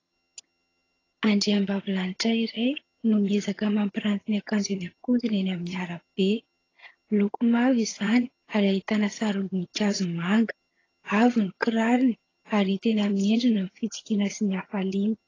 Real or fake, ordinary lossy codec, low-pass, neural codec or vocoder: fake; AAC, 32 kbps; 7.2 kHz; vocoder, 22.05 kHz, 80 mel bands, HiFi-GAN